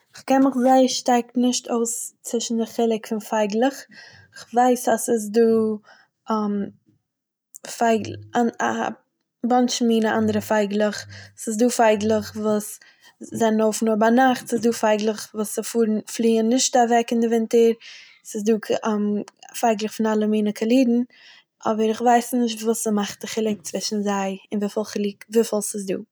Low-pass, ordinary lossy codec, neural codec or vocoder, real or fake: none; none; none; real